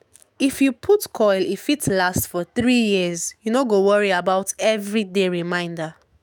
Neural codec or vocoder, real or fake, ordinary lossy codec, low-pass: autoencoder, 48 kHz, 128 numbers a frame, DAC-VAE, trained on Japanese speech; fake; none; none